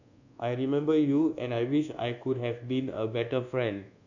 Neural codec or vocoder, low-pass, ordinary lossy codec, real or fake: codec, 24 kHz, 1.2 kbps, DualCodec; 7.2 kHz; none; fake